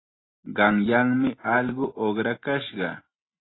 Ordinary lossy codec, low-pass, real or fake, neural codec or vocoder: AAC, 16 kbps; 7.2 kHz; real; none